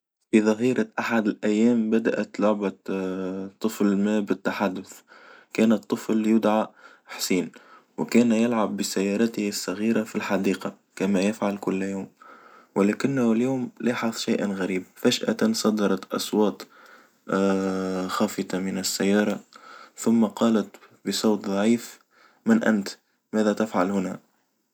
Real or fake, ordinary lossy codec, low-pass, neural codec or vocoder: real; none; none; none